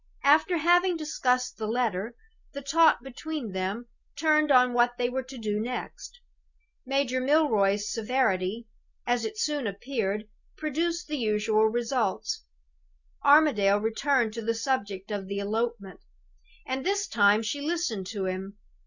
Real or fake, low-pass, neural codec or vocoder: real; 7.2 kHz; none